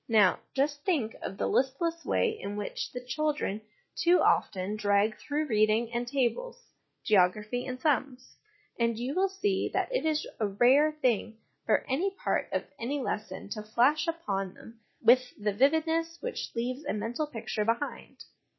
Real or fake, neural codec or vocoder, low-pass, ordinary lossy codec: real; none; 7.2 kHz; MP3, 24 kbps